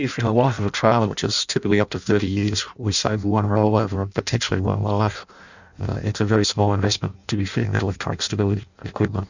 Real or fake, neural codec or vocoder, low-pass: fake; codec, 16 kHz in and 24 kHz out, 0.6 kbps, FireRedTTS-2 codec; 7.2 kHz